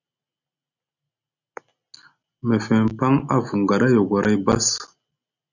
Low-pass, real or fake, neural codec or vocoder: 7.2 kHz; real; none